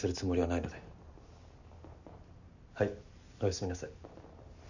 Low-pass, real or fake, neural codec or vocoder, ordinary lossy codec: 7.2 kHz; real; none; none